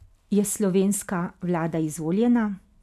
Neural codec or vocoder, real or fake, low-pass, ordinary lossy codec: none; real; 14.4 kHz; none